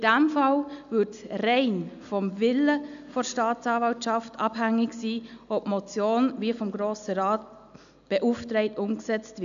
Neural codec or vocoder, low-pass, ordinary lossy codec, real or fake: none; 7.2 kHz; AAC, 96 kbps; real